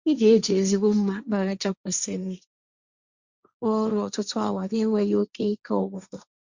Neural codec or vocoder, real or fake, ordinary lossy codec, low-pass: codec, 16 kHz, 1.1 kbps, Voila-Tokenizer; fake; Opus, 64 kbps; 7.2 kHz